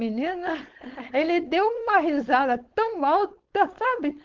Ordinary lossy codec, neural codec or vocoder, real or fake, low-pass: Opus, 16 kbps; codec, 16 kHz, 4.8 kbps, FACodec; fake; 7.2 kHz